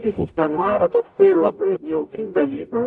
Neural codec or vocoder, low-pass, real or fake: codec, 44.1 kHz, 0.9 kbps, DAC; 10.8 kHz; fake